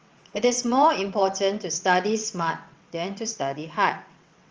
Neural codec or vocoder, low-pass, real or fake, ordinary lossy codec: vocoder, 22.05 kHz, 80 mel bands, WaveNeXt; 7.2 kHz; fake; Opus, 24 kbps